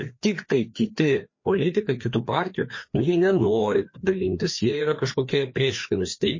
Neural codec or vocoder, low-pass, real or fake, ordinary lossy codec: codec, 16 kHz, 2 kbps, FreqCodec, larger model; 7.2 kHz; fake; MP3, 32 kbps